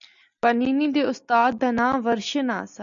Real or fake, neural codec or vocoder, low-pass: real; none; 7.2 kHz